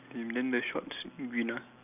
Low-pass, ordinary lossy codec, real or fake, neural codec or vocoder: 3.6 kHz; none; real; none